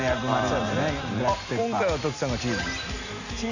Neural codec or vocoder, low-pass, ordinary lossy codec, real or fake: none; 7.2 kHz; none; real